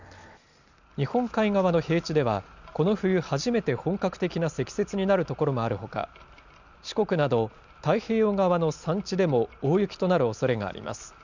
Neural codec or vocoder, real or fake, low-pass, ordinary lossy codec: none; real; 7.2 kHz; none